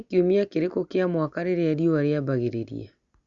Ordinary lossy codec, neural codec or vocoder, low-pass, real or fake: Opus, 64 kbps; none; 7.2 kHz; real